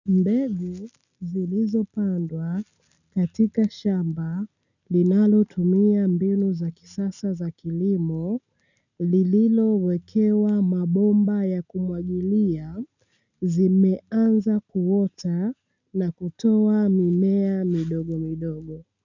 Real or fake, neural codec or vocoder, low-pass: real; none; 7.2 kHz